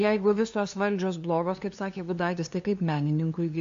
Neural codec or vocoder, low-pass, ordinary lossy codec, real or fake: codec, 16 kHz, 2 kbps, FunCodec, trained on LibriTTS, 25 frames a second; 7.2 kHz; AAC, 48 kbps; fake